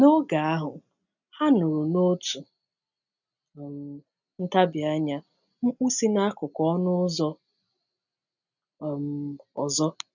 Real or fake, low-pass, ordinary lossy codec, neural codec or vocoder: real; 7.2 kHz; none; none